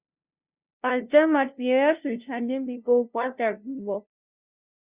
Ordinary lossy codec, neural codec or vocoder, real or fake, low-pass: Opus, 64 kbps; codec, 16 kHz, 0.5 kbps, FunCodec, trained on LibriTTS, 25 frames a second; fake; 3.6 kHz